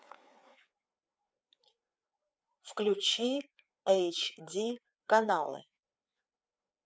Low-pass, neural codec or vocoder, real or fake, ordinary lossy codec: none; codec, 16 kHz, 4 kbps, FreqCodec, larger model; fake; none